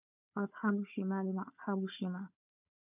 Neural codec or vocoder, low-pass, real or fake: codec, 16 kHz, 4 kbps, FunCodec, trained on Chinese and English, 50 frames a second; 3.6 kHz; fake